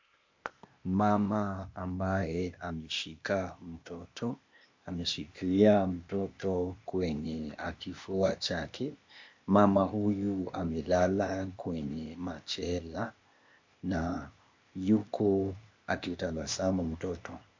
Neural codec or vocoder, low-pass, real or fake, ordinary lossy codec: codec, 16 kHz, 0.8 kbps, ZipCodec; 7.2 kHz; fake; MP3, 48 kbps